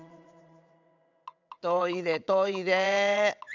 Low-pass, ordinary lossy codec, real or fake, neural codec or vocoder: 7.2 kHz; none; fake; vocoder, 22.05 kHz, 80 mel bands, WaveNeXt